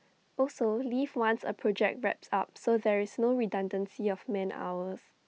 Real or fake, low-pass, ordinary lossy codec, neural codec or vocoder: real; none; none; none